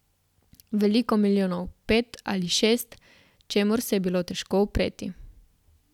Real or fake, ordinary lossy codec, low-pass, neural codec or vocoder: real; none; 19.8 kHz; none